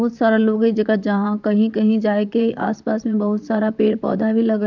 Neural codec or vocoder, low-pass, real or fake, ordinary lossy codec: vocoder, 44.1 kHz, 128 mel bands, Pupu-Vocoder; 7.2 kHz; fake; none